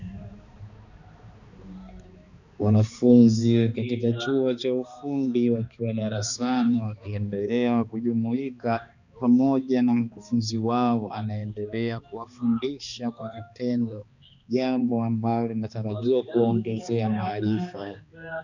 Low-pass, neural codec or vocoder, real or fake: 7.2 kHz; codec, 16 kHz, 2 kbps, X-Codec, HuBERT features, trained on balanced general audio; fake